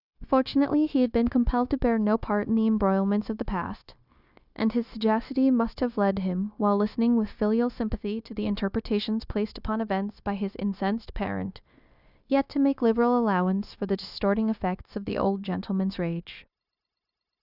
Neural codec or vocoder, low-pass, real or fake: codec, 16 kHz, 0.9 kbps, LongCat-Audio-Codec; 5.4 kHz; fake